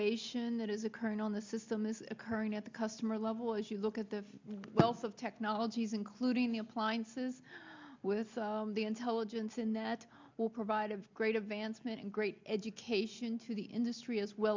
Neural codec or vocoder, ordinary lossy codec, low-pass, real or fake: none; MP3, 64 kbps; 7.2 kHz; real